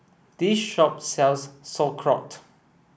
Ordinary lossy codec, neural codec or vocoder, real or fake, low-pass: none; none; real; none